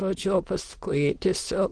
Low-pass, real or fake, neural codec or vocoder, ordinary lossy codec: 9.9 kHz; fake; autoencoder, 22.05 kHz, a latent of 192 numbers a frame, VITS, trained on many speakers; Opus, 16 kbps